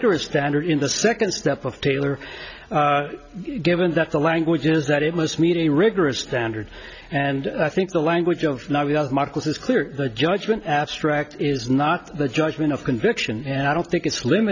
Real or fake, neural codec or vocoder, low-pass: real; none; 7.2 kHz